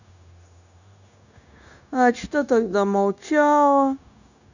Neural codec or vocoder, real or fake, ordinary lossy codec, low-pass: codec, 16 kHz, 0.9 kbps, LongCat-Audio-Codec; fake; none; 7.2 kHz